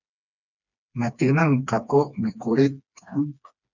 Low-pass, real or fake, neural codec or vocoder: 7.2 kHz; fake; codec, 16 kHz, 2 kbps, FreqCodec, smaller model